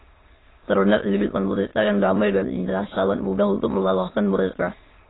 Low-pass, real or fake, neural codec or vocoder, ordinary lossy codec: 7.2 kHz; fake; autoencoder, 22.05 kHz, a latent of 192 numbers a frame, VITS, trained on many speakers; AAC, 16 kbps